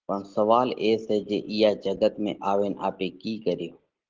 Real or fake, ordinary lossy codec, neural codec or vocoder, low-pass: real; Opus, 16 kbps; none; 7.2 kHz